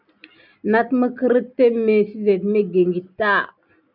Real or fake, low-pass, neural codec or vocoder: real; 5.4 kHz; none